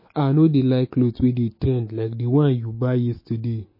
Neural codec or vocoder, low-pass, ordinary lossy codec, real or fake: autoencoder, 48 kHz, 128 numbers a frame, DAC-VAE, trained on Japanese speech; 5.4 kHz; MP3, 24 kbps; fake